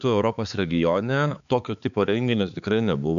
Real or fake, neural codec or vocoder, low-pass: fake; codec, 16 kHz, 4 kbps, X-Codec, HuBERT features, trained on balanced general audio; 7.2 kHz